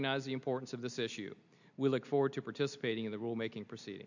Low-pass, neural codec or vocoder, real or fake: 7.2 kHz; none; real